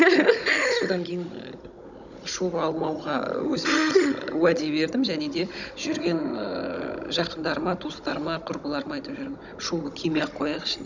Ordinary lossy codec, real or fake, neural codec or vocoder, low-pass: none; fake; codec, 16 kHz, 16 kbps, FunCodec, trained on Chinese and English, 50 frames a second; 7.2 kHz